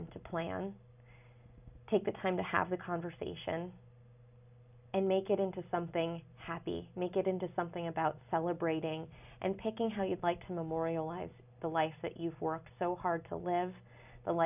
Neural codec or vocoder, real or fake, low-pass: none; real; 3.6 kHz